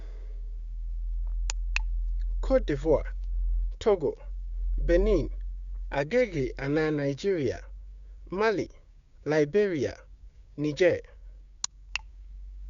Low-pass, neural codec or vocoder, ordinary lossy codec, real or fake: 7.2 kHz; codec, 16 kHz, 6 kbps, DAC; Opus, 64 kbps; fake